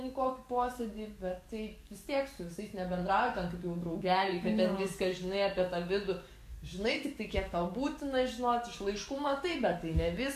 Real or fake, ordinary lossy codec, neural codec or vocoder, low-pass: fake; MP3, 64 kbps; codec, 44.1 kHz, 7.8 kbps, DAC; 14.4 kHz